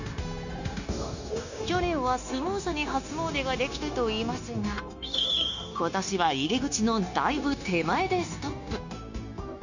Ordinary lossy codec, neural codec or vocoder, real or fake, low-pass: none; codec, 16 kHz, 0.9 kbps, LongCat-Audio-Codec; fake; 7.2 kHz